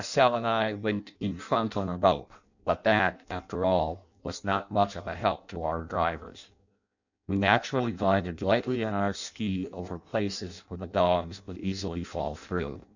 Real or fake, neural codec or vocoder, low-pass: fake; codec, 16 kHz in and 24 kHz out, 0.6 kbps, FireRedTTS-2 codec; 7.2 kHz